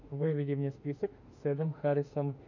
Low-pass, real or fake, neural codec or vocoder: 7.2 kHz; fake; autoencoder, 48 kHz, 32 numbers a frame, DAC-VAE, trained on Japanese speech